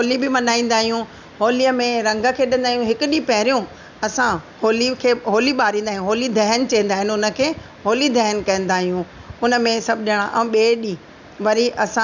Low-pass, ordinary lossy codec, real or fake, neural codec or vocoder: 7.2 kHz; none; real; none